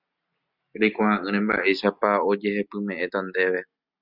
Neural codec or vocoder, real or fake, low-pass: none; real; 5.4 kHz